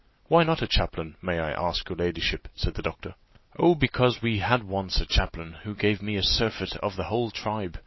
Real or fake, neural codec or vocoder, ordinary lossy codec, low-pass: real; none; MP3, 24 kbps; 7.2 kHz